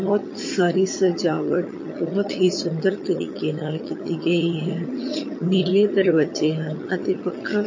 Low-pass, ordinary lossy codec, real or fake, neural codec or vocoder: 7.2 kHz; MP3, 32 kbps; fake; vocoder, 22.05 kHz, 80 mel bands, HiFi-GAN